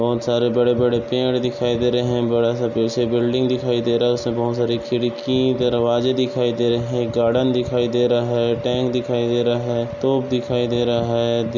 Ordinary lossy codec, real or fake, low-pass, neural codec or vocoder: none; real; 7.2 kHz; none